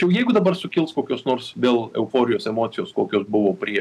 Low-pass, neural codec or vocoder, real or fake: 14.4 kHz; none; real